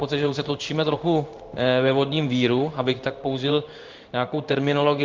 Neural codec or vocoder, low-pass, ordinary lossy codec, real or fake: codec, 16 kHz in and 24 kHz out, 1 kbps, XY-Tokenizer; 7.2 kHz; Opus, 24 kbps; fake